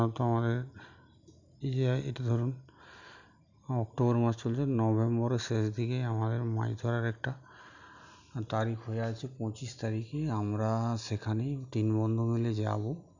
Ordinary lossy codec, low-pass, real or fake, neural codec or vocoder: none; 7.2 kHz; real; none